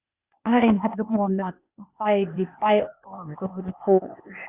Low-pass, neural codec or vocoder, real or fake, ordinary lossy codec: 3.6 kHz; codec, 16 kHz, 0.8 kbps, ZipCodec; fake; Opus, 64 kbps